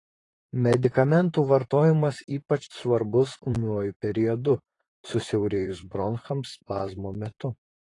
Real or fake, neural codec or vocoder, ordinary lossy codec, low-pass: fake; vocoder, 44.1 kHz, 128 mel bands, Pupu-Vocoder; AAC, 32 kbps; 10.8 kHz